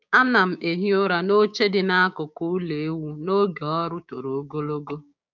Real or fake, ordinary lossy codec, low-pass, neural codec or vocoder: fake; none; 7.2 kHz; codec, 16 kHz, 6 kbps, DAC